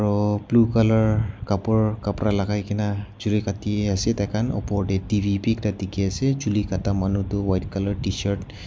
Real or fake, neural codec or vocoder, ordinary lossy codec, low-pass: real; none; none; none